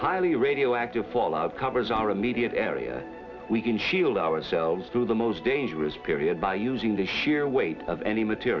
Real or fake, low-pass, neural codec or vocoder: real; 7.2 kHz; none